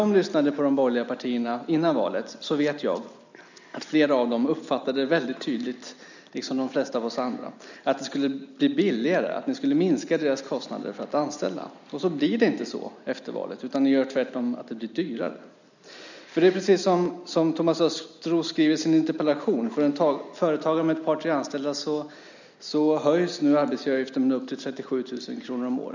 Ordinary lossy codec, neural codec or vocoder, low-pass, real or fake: none; none; 7.2 kHz; real